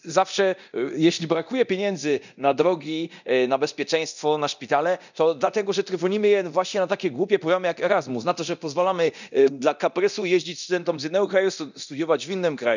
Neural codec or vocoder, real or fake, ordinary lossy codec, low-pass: codec, 24 kHz, 0.9 kbps, DualCodec; fake; none; 7.2 kHz